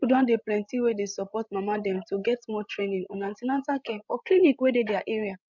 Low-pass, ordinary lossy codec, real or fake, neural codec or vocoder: 7.2 kHz; none; fake; codec, 16 kHz, 16 kbps, FreqCodec, larger model